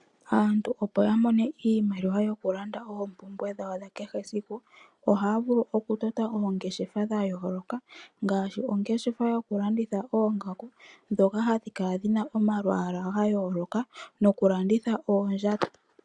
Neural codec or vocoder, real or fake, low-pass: none; real; 10.8 kHz